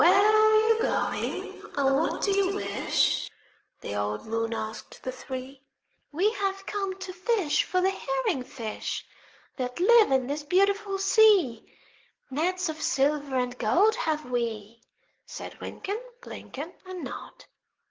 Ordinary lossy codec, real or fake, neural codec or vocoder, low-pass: Opus, 16 kbps; fake; codec, 44.1 kHz, 7.8 kbps, DAC; 7.2 kHz